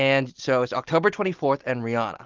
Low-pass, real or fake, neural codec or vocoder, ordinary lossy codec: 7.2 kHz; real; none; Opus, 32 kbps